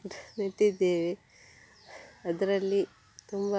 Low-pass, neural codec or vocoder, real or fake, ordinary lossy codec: none; none; real; none